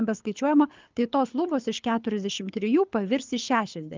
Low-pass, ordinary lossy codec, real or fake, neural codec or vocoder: 7.2 kHz; Opus, 24 kbps; fake; codec, 24 kHz, 6 kbps, HILCodec